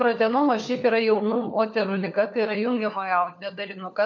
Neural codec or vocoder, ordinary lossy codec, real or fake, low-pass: codec, 16 kHz, 4 kbps, FunCodec, trained on LibriTTS, 50 frames a second; MP3, 48 kbps; fake; 7.2 kHz